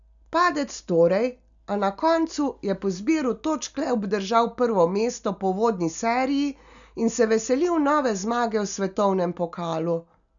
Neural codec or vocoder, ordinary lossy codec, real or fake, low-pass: none; none; real; 7.2 kHz